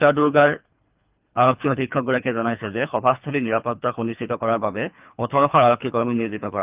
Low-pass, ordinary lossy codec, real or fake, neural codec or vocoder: 3.6 kHz; Opus, 24 kbps; fake; codec, 24 kHz, 3 kbps, HILCodec